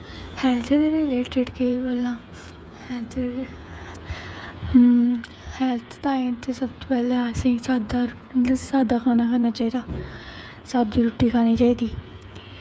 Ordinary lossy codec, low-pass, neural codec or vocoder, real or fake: none; none; codec, 16 kHz, 8 kbps, FreqCodec, smaller model; fake